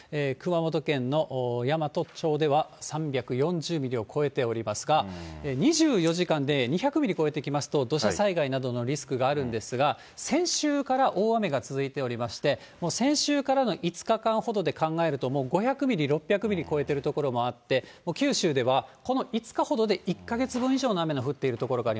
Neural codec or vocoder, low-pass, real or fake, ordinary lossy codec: none; none; real; none